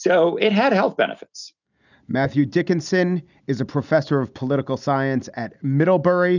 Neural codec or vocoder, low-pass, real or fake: none; 7.2 kHz; real